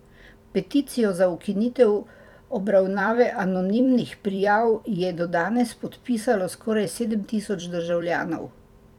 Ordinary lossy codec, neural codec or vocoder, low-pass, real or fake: none; vocoder, 44.1 kHz, 128 mel bands every 256 samples, BigVGAN v2; 19.8 kHz; fake